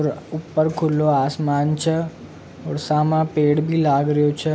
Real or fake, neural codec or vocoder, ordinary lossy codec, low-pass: real; none; none; none